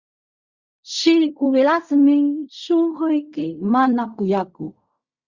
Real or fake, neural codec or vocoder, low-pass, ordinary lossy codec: fake; codec, 16 kHz in and 24 kHz out, 0.4 kbps, LongCat-Audio-Codec, fine tuned four codebook decoder; 7.2 kHz; Opus, 64 kbps